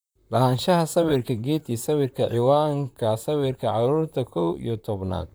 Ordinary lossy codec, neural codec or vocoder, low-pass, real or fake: none; vocoder, 44.1 kHz, 128 mel bands, Pupu-Vocoder; none; fake